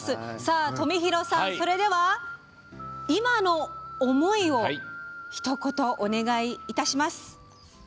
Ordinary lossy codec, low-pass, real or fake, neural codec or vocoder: none; none; real; none